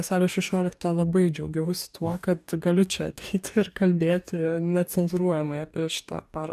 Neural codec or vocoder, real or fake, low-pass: codec, 44.1 kHz, 2.6 kbps, DAC; fake; 14.4 kHz